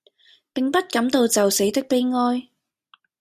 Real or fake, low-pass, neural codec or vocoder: real; 14.4 kHz; none